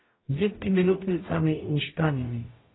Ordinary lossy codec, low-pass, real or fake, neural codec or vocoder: AAC, 16 kbps; 7.2 kHz; fake; codec, 44.1 kHz, 0.9 kbps, DAC